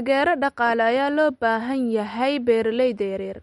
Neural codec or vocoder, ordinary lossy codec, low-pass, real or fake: none; MP3, 48 kbps; 19.8 kHz; real